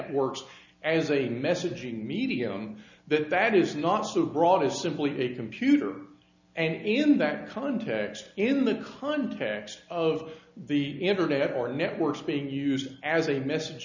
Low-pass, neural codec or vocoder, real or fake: 7.2 kHz; none; real